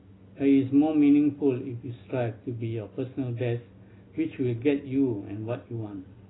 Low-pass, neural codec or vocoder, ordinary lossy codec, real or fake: 7.2 kHz; none; AAC, 16 kbps; real